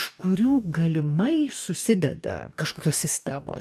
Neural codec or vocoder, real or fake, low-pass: codec, 44.1 kHz, 2.6 kbps, DAC; fake; 14.4 kHz